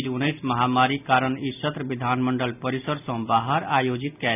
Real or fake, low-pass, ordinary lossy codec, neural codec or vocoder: real; 3.6 kHz; none; none